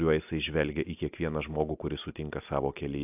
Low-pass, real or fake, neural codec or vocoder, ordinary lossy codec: 3.6 kHz; real; none; AAC, 32 kbps